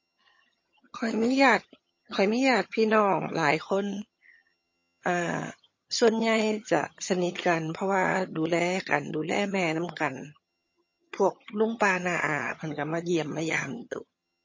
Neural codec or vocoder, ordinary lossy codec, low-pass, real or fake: vocoder, 22.05 kHz, 80 mel bands, HiFi-GAN; MP3, 32 kbps; 7.2 kHz; fake